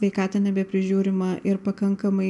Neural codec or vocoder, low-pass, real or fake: none; 10.8 kHz; real